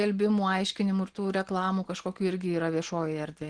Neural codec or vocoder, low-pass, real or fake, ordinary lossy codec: none; 9.9 kHz; real; Opus, 16 kbps